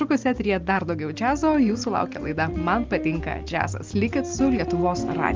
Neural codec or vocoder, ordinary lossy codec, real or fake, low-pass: none; Opus, 32 kbps; real; 7.2 kHz